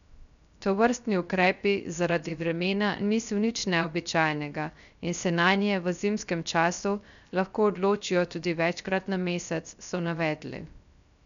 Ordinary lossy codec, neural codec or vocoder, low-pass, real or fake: none; codec, 16 kHz, 0.3 kbps, FocalCodec; 7.2 kHz; fake